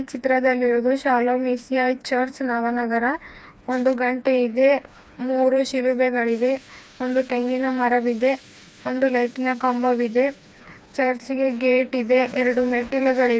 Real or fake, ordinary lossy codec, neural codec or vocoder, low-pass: fake; none; codec, 16 kHz, 2 kbps, FreqCodec, smaller model; none